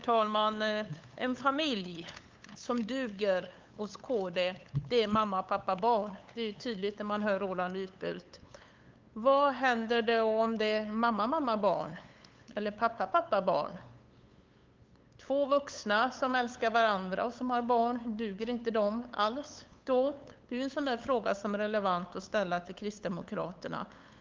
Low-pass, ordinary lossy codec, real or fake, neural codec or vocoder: 7.2 kHz; Opus, 24 kbps; fake; codec, 16 kHz, 8 kbps, FunCodec, trained on LibriTTS, 25 frames a second